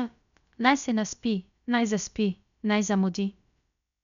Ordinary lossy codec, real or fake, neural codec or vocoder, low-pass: none; fake; codec, 16 kHz, about 1 kbps, DyCAST, with the encoder's durations; 7.2 kHz